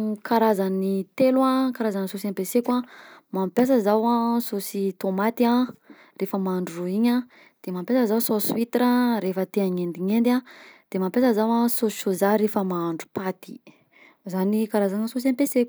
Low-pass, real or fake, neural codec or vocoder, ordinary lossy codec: none; real; none; none